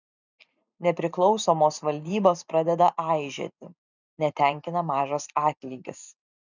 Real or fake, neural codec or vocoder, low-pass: fake; vocoder, 44.1 kHz, 128 mel bands every 512 samples, BigVGAN v2; 7.2 kHz